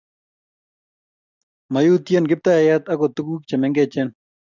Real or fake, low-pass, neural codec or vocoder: real; 7.2 kHz; none